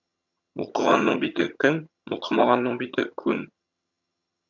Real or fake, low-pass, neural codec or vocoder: fake; 7.2 kHz; vocoder, 22.05 kHz, 80 mel bands, HiFi-GAN